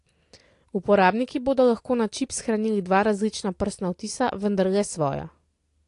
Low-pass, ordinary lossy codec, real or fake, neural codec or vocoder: 10.8 kHz; AAC, 48 kbps; real; none